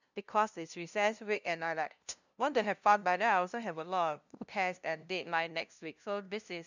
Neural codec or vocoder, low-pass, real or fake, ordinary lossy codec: codec, 16 kHz, 0.5 kbps, FunCodec, trained on LibriTTS, 25 frames a second; 7.2 kHz; fake; none